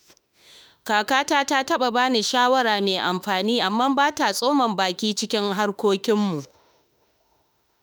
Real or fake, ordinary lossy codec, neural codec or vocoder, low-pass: fake; none; autoencoder, 48 kHz, 32 numbers a frame, DAC-VAE, trained on Japanese speech; none